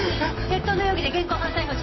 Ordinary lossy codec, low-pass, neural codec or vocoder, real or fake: MP3, 24 kbps; 7.2 kHz; none; real